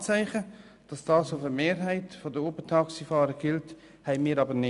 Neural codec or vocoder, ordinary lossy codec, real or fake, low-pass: none; none; real; 10.8 kHz